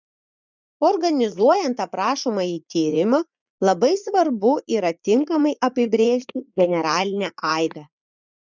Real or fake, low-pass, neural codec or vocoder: fake; 7.2 kHz; vocoder, 44.1 kHz, 80 mel bands, Vocos